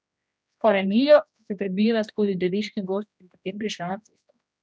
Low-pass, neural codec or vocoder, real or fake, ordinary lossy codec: none; codec, 16 kHz, 1 kbps, X-Codec, HuBERT features, trained on general audio; fake; none